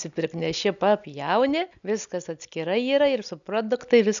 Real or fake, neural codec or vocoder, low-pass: real; none; 7.2 kHz